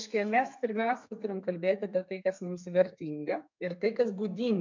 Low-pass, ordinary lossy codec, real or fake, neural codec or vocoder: 7.2 kHz; MP3, 64 kbps; fake; codec, 32 kHz, 1.9 kbps, SNAC